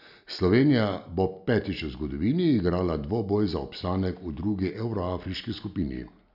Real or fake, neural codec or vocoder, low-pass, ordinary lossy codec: real; none; 5.4 kHz; none